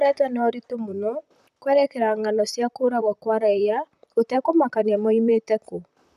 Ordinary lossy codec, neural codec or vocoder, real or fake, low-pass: none; vocoder, 44.1 kHz, 128 mel bands, Pupu-Vocoder; fake; 14.4 kHz